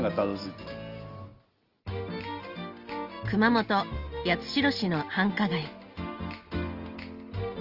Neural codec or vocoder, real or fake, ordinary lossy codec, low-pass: none; real; Opus, 24 kbps; 5.4 kHz